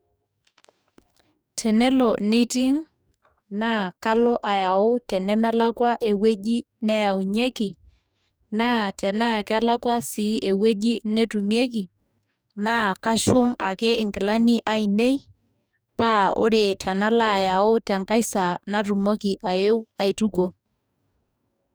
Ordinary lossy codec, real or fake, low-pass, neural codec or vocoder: none; fake; none; codec, 44.1 kHz, 2.6 kbps, DAC